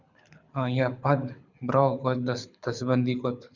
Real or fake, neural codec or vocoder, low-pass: fake; codec, 24 kHz, 6 kbps, HILCodec; 7.2 kHz